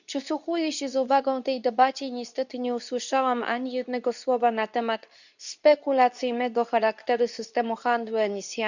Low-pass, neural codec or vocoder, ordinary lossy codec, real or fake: 7.2 kHz; codec, 24 kHz, 0.9 kbps, WavTokenizer, medium speech release version 2; none; fake